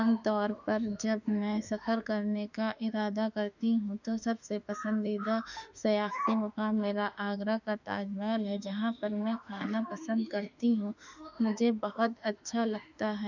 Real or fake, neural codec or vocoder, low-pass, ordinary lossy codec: fake; autoencoder, 48 kHz, 32 numbers a frame, DAC-VAE, trained on Japanese speech; 7.2 kHz; none